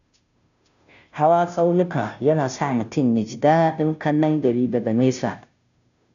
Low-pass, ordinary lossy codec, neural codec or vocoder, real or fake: 7.2 kHz; none; codec, 16 kHz, 0.5 kbps, FunCodec, trained on Chinese and English, 25 frames a second; fake